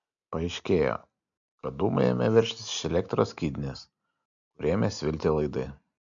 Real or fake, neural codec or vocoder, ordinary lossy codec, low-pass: real; none; MP3, 96 kbps; 7.2 kHz